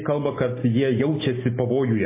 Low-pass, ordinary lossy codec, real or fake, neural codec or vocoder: 3.6 kHz; MP3, 16 kbps; real; none